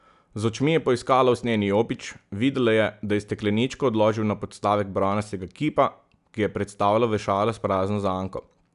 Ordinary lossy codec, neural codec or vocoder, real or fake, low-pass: none; none; real; 10.8 kHz